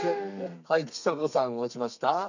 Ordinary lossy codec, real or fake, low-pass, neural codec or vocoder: none; fake; 7.2 kHz; codec, 32 kHz, 1.9 kbps, SNAC